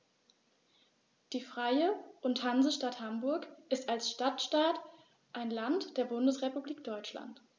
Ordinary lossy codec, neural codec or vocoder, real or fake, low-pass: none; none; real; none